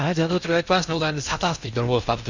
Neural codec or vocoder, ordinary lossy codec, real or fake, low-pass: codec, 16 kHz in and 24 kHz out, 0.6 kbps, FocalCodec, streaming, 2048 codes; Opus, 64 kbps; fake; 7.2 kHz